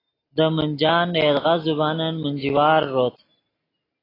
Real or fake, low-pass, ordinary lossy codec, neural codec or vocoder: real; 5.4 kHz; AAC, 24 kbps; none